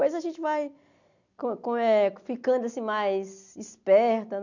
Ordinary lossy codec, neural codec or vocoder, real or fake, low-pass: none; none; real; 7.2 kHz